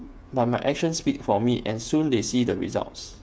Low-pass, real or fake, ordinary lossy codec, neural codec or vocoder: none; fake; none; codec, 16 kHz, 8 kbps, FreqCodec, smaller model